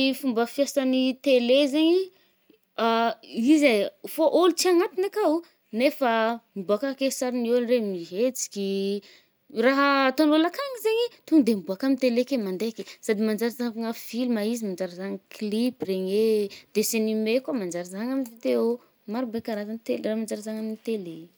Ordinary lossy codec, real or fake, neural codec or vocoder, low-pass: none; real; none; none